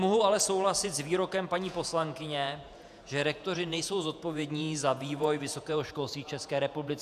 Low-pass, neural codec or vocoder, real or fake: 14.4 kHz; vocoder, 48 kHz, 128 mel bands, Vocos; fake